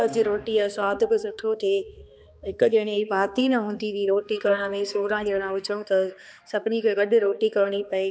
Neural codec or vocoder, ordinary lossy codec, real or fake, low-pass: codec, 16 kHz, 2 kbps, X-Codec, HuBERT features, trained on balanced general audio; none; fake; none